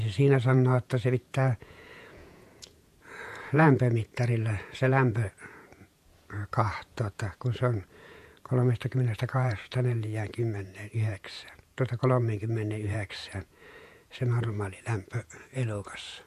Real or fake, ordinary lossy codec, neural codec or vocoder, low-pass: fake; MP3, 64 kbps; vocoder, 48 kHz, 128 mel bands, Vocos; 14.4 kHz